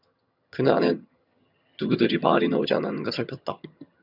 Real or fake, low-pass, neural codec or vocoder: fake; 5.4 kHz; vocoder, 22.05 kHz, 80 mel bands, HiFi-GAN